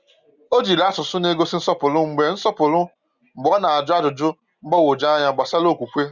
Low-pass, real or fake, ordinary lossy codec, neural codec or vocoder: 7.2 kHz; real; Opus, 64 kbps; none